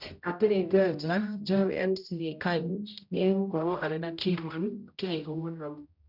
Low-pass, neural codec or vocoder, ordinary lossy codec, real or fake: 5.4 kHz; codec, 16 kHz, 0.5 kbps, X-Codec, HuBERT features, trained on general audio; none; fake